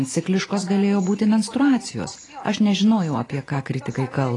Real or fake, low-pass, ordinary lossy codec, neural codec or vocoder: real; 10.8 kHz; AAC, 32 kbps; none